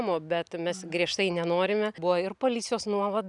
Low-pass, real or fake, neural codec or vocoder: 10.8 kHz; real; none